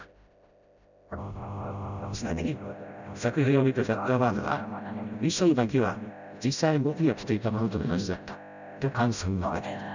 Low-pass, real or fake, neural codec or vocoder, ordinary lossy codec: 7.2 kHz; fake; codec, 16 kHz, 0.5 kbps, FreqCodec, smaller model; none